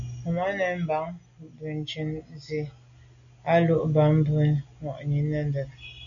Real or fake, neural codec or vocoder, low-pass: real; none; 7.2 kHz